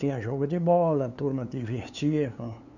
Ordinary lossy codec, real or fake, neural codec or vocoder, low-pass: none; fake; codec, 16 kHz, 2 kbps, FunCodec, trained on LibriTTS, 25 frames a second; 7.2 kHz